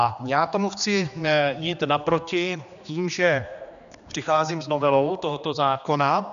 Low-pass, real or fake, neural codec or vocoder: 7.2 kHz; fake; codec, 16 kHz, 2 kbps, X-Codec, HuBERT features, trained on general audio